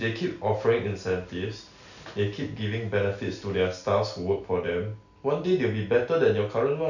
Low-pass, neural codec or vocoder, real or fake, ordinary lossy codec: 7.2 kHz; none; real; none